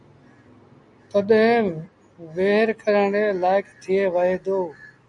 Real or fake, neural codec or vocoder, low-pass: real; none; 10.8 kHz